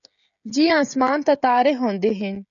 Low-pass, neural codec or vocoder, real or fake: 7.2 kHz; codec, 16 kHz, 16 kbps, FreqCodec, smaller model; fake